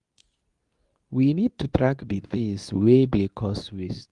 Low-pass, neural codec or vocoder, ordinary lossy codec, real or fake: 10.8 kHz; codec, 24 kHz, 0.9 kbps, WavTokenizer, medium speech release version 2; Opus, 32 kbps; fake